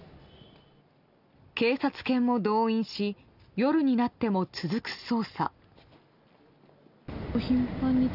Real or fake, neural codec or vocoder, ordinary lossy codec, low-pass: real; none; none; 5.4 kHz